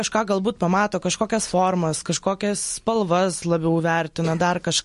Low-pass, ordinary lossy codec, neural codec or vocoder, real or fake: 14.4 kHz; MP3, 48 kbps; none; real